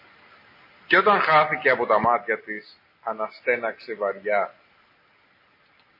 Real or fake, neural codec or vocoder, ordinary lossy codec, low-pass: real; none; MP3, 24 kbps; 5.4 kHz